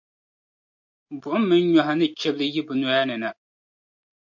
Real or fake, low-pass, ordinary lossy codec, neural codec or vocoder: real; 7.2 kHz; MP3, 48 kbps; none